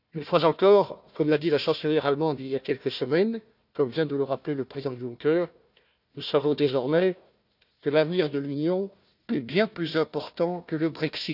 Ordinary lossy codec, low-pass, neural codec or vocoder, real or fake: none; 5.4 kHz; codec, 16 kHz, 1 kbps, FunCodec, trained on Chinese and English, 50 frames a second; fake